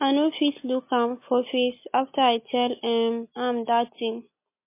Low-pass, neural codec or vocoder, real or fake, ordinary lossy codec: 3.6 kHz; none; real; MP3, 24 kbps